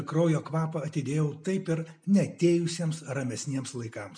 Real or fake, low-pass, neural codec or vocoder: real; 9.9 kHz; none